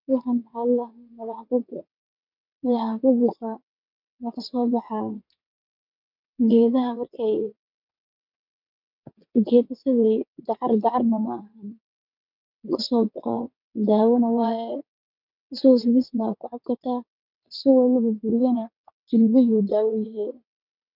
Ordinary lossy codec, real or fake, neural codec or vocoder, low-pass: none; fake; vocoder, 22.05 kHz, 80 mel bands, WaveNeXt; 5.4 kHz